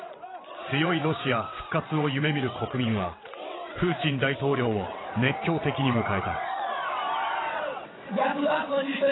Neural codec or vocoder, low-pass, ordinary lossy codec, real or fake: vocoder, 22.05 kHz, 80 mel bands, WaveNeXt; 7.2 kHz; AAC, 16 kbps; fake